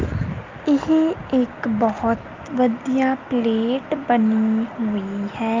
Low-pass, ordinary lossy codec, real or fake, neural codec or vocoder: 7.2 kHz; Opus, 24 kbps; real; none